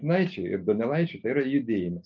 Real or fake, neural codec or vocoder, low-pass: real; none; 7.2 kHz